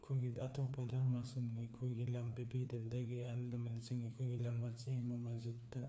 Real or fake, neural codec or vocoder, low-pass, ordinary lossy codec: fake; codec, 16 kHz, 2 kbps, FreqCodec, larger model; none; none